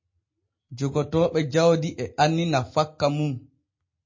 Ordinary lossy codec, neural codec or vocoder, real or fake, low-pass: MP3, 32 kbps; none; real; 7.2 kHz